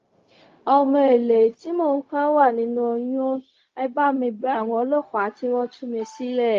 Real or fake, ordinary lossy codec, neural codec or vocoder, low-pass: fake; Opus, 32 kbps; codec, 16 kHz, 0.4 kbps, LongCat-Audio-Codec; 7.2 kHz